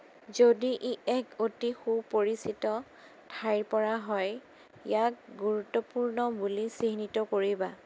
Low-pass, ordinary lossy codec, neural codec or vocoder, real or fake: none; none; none; real